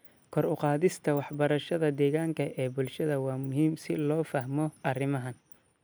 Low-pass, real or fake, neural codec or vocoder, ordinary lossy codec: none; real; none; none